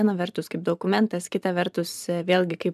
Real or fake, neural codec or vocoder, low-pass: fake; vocoder, 44.1 kHz, 128 mel bands every 512 samples, BigVGAN v2; 14.4 kHz